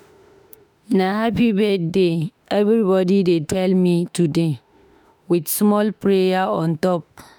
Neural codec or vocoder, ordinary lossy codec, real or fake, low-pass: autoencoder, 48 kHz, 32 numbers a frame, DAC-VAE, trained on Japanese speech; none; fake; none